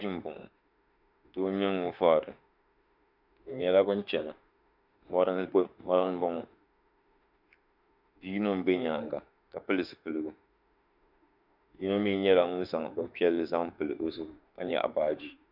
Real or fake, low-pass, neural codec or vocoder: fake; 5.4 kHz; autoencoder, 48 kHz, 32 numbers a frame, DAC-VAE, trained on Japanese speech